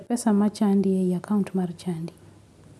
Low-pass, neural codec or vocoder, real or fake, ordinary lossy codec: none; none; real; none